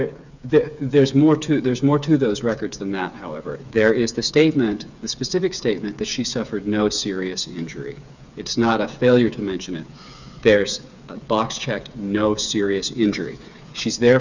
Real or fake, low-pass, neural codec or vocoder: fake; 7.2 kHz; codec, 16 kHz, 8 kbps, FreqCodec, smaller model